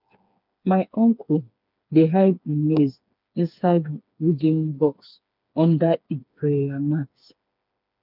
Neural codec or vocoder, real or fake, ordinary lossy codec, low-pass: codec, 16 kHz, 4 kbps, FreqCodec, smaller model; fake; none; 5.4 kHz